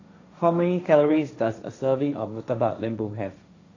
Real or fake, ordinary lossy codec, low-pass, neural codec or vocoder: fake; AAC, 48 kbps; 7.2 kHz; codec, 16 kHz, 1.1 kbps, Voila-Tokenizer